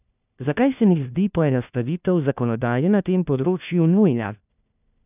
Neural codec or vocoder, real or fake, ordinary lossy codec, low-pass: codec, 16 kHz, 1 kbps, FunCodec, trained on LibriTTS, 50 frames a second; fake; none; 3.6 kHz